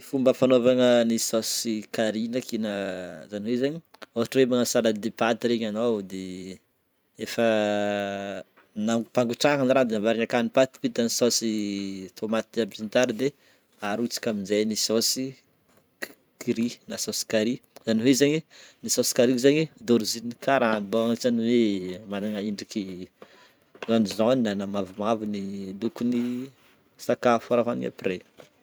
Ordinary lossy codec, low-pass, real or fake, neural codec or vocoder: none; none; fake; vocoder, 44.1 kHz, 128 mel bands every 512 samples, BigVGAN v2